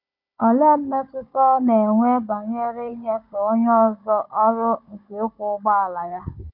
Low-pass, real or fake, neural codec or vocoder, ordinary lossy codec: 5.4 kHz; fake; codec, 16 kHz, 16 kbps, FunCodec, trained on Chinese and English, 50 frames a second; MP3, 48 kbps